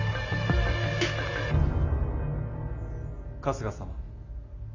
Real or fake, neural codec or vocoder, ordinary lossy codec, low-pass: real; none; AAC, 48 kbps; 7.2 kHz